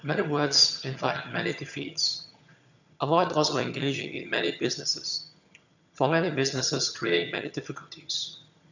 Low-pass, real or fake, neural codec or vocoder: 7.2 kHz; fake; vocoder, 22.05 kHz, 80 mel bands, HiFi-GAN